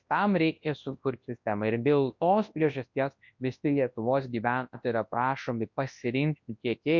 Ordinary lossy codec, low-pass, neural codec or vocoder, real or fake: MP3, 48 kbps; 7.2 kHz; codec, 24 kHz, 0.9 kbps, WavTokenizer, large speech release; fake